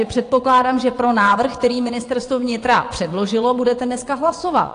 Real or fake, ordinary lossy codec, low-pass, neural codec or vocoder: fake; AAC, 48 kbps; 9.9 kHz; vocoder, 22.05 kHz, 80 mel bands, WaveNeXt